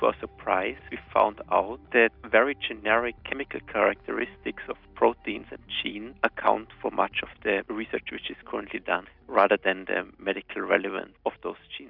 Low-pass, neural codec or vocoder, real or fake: 5.4 kHz; none; real